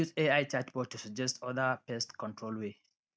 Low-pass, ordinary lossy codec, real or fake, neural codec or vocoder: none; none; real; none